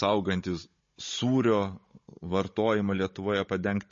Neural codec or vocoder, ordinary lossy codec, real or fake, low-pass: codec, 16 kHz, 16 kbps, FunCodec, trained on Chinese and English, 50 frames a second; MP3, 32 kbps; fake; 7.2 kHz